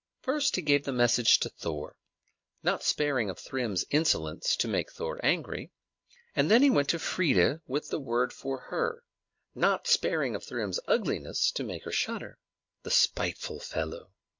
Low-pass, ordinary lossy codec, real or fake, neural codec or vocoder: 7.2 kHz; MP3, 48 kbps; real; none